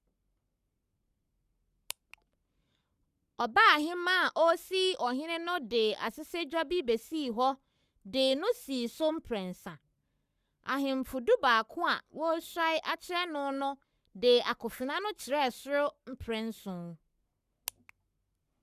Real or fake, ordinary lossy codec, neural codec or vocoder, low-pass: fake; Opus, 64 kbps; codec, 44.1 kHz, 7.8 kbps, Pupu-Codec; 14.4 kHz